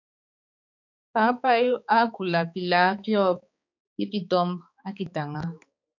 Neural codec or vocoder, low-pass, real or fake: codec, 16 kHz, 4 kbps, X-Codec, HuBERT features, trained on balanced general audio; 7.2 kHz; fake